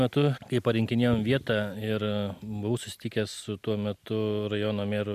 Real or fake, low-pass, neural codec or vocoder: real; 14.4 kHz; none